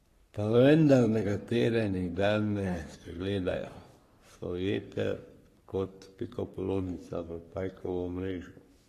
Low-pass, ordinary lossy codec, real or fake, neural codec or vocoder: 14.4 kHz; AAC, 48 kbps; fake; codec, 44.1 kHz, 3.4 kbps, Pupu-Codec